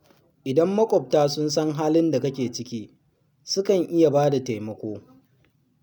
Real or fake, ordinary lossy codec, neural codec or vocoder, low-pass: real; none; none; none